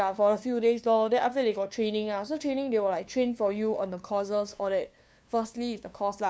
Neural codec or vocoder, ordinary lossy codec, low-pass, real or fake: codec, 16 kHz, 2 kbps, FunCodec, trained on LibriTTS, 25 frames a second; none; none; fake